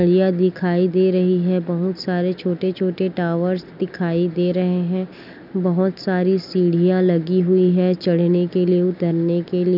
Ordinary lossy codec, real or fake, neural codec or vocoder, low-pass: none; real; none; 5.4 kHz